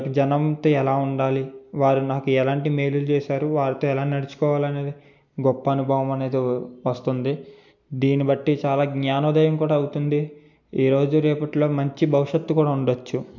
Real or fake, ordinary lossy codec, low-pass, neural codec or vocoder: real; none; 7.2 kHz; none